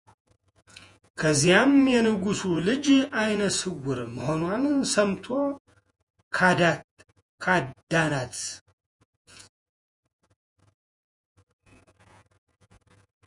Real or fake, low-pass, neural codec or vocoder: fake; 10.8 kHz; vocoder, 48 kHz, 128 mel bands, Vocos